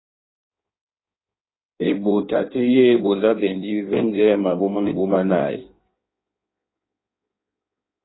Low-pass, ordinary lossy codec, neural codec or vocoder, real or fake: 7.2 kHz; AAC, 16 kbps; codec, 16 kHz in and 24 kHz out, 1.1 kbps, FireRedTTS-2 codec; fake